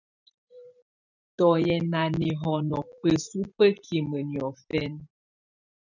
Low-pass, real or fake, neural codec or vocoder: 7.2 kHz; fake; vocoder, 44.1 kHz, 128 mel bands every 512 samples, BigVGAN v2